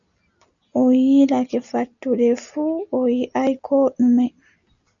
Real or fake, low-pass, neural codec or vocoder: real; 7.2 kHz; none